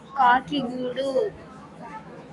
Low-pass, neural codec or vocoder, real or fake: 10.8 kHz; autoencoder, 48 kHz, 128 numbers a frame, DAC-VAE, trained on Japanese speech; fake